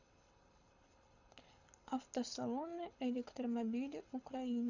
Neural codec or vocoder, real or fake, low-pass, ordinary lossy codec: codec, 24 kHz, 6 kbps, HILCodec; fake; 7.2 kHz; none